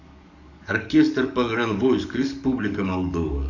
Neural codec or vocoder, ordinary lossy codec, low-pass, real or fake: codec, 44.1 kHz, 7.8 kbps, DAC; none; 7.2 kHz; fake